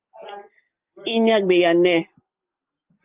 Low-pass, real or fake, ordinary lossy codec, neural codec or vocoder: 3.6 kHz; real; Opus, 32 kbps; none